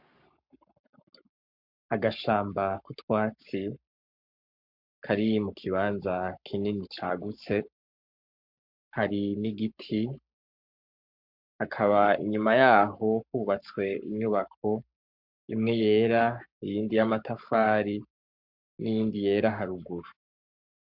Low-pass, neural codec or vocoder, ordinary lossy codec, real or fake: 5.4 kHz; codec, 44.1 kHz, 7.8 kbps, Pupu-Codec; MP3, 48 kbps; fake